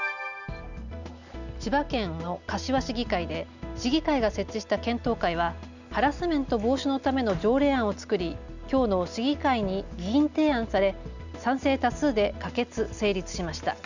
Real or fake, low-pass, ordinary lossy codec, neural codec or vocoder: real; 7.2 kHz; none; none